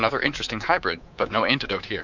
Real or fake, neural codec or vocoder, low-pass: fake; codec, 24 kHz, 3.1 kbps, DualCodec; 7.2 kHz